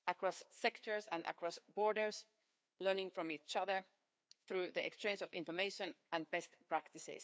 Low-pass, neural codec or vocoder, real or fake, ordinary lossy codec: none; codec, 16 kHz, 2 kbps, FreqCodec, larger model; fake; none